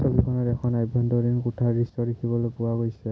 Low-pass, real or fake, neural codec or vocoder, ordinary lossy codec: none; real; none; none